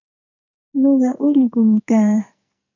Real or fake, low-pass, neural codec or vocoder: fake; 7.2 kHz; codec, 44.1 kHz, 2.6 kbps, SNAC